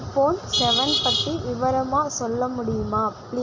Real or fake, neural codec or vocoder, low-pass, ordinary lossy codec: real; none; 7.2 kHz; MP3, 64 kbps